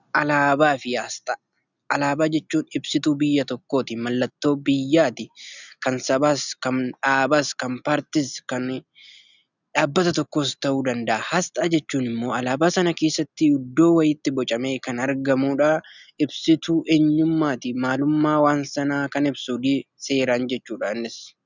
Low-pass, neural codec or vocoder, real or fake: 7.2 kHz; none; real